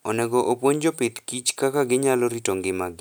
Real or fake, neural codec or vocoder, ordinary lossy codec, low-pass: real; none; none; none